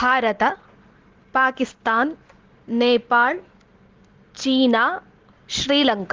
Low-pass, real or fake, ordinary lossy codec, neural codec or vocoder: 7.2 kHz; real; Opus, 16 kbps; none